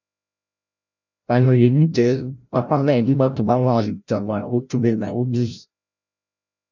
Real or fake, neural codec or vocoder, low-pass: fake; codec, 16 kHz, 0.5 kbps, FreqCodec, larger model; 7.2 kHz